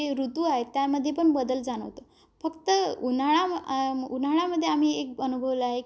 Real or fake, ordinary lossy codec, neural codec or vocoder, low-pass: real; none; none; none